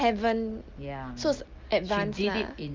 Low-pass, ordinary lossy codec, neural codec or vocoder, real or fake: 7.2 kHz; Opus, 24 kbps; none; real